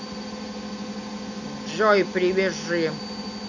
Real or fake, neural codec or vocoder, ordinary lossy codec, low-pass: real; none; none; 7.2 kHz